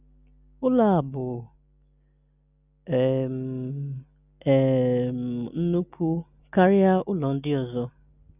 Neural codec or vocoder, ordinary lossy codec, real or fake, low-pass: none; none; real; 3.6 kHz